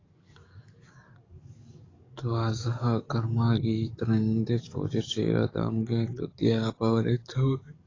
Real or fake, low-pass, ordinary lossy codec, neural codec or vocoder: fake; 7.2 kHz; AAC, 32 kbps; codec, 44.1 kHz, 7.8 kbps, DAC